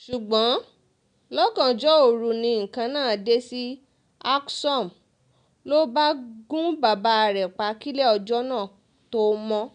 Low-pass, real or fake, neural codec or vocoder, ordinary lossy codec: 9.9 kHz; real; none; none